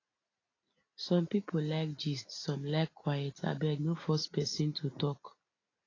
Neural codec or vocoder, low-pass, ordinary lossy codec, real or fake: none; 7.2 kHz; AAC, 32 kbps; real